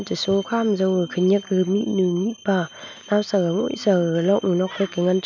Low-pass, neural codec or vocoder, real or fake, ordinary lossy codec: 7.2 kHz; none; real; none